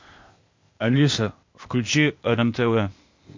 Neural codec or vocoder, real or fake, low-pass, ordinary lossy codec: codec, 16 kHz, 0.8 kbps, ZipCodec; fake; 7.2 kHz; MP3, 48 kbps